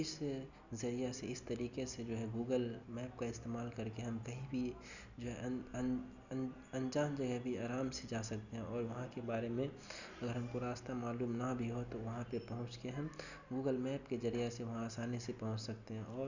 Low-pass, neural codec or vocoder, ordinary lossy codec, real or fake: 7.2 kHz; none; none; real